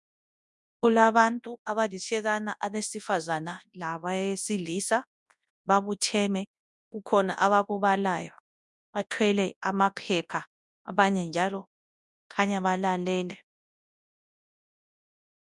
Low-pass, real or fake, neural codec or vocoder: 10.8 kHz; fake; codec, 24 kHz, 0.9 kbps, WavTokenizer, large speech release